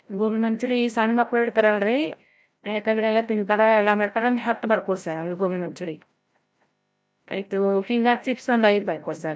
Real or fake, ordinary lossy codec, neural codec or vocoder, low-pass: fake; none; codec, 16 kHz, 0.5 kbps, FreqCodec, larger model; none